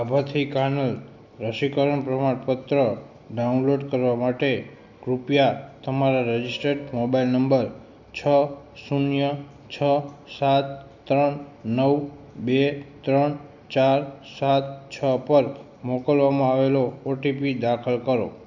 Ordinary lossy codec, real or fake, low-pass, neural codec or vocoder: none; real; 7.2 kHz; none